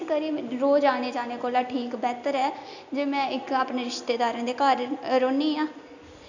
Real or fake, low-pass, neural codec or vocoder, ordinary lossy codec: real; 7.2 kHz; none; none